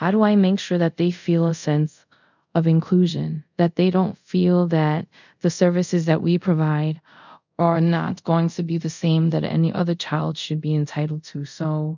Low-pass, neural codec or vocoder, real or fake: 7.2 kHz; codec, 24 kHz, 0.5 kbps, DualCodec; fake